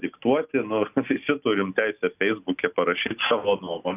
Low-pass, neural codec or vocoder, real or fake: 3.6 kHz; none; real